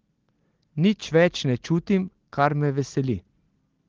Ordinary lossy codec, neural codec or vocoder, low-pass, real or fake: Opus, 16 kbps; none; 7.2 kHz; real